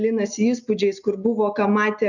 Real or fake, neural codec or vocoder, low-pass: real; none; 7.2 kHz